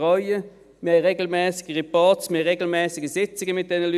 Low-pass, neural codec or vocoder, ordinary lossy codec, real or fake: 14.4 kHz; none; none; real